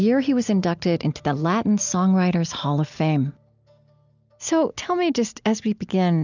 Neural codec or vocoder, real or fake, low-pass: none; real; 7.2 kHz